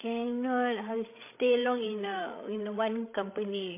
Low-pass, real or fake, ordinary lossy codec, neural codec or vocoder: 3.6 kHz; fake; MP3, 24 kbps; codec, 16 kHz, 16 kbps, FreqCodec, larger model